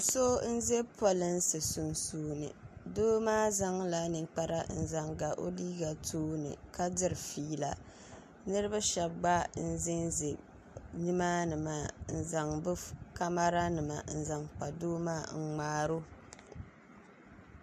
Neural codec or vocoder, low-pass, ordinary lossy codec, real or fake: none; 14.4 kHz; MP3, 64 kbps; real